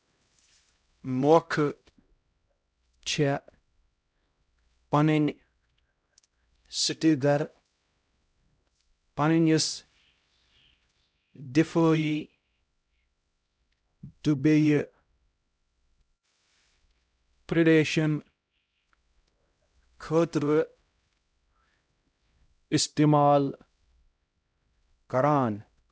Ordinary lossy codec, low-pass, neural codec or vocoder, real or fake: none; none; codec, 16 kHz, 0.5 kbps, X-Codec, HuBERT features, trained on LibriSpeech; fake